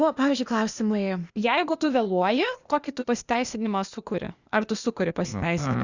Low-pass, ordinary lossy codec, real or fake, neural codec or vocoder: 7.2 kHz; Opus, 64 kbps; fake; codec, 16 kHz, 0.8 kbps, ZipCodec